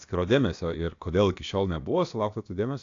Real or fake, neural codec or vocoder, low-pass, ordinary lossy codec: fake; codec, 16 kHz, about 1 kbps, DyCAST, with the encoder's durations; 7.2 kHz; AAC, 48 kbps